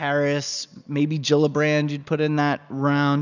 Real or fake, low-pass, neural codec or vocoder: real; 7.2 kHz; none